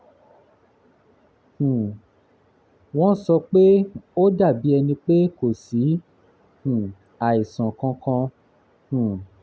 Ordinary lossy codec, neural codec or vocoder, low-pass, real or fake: none; none; none; real